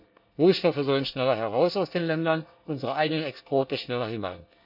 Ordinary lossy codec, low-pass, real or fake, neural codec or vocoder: none; 5.4 kHz; fake; codec, 24 kHz, 1 kbps, SNAC